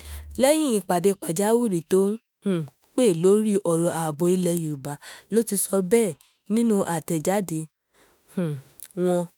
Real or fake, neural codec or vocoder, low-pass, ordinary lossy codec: fake; autoencoder, 48 kHz, 32 numbers a frame, DAC-VAE, trained on Japanese speech; none; none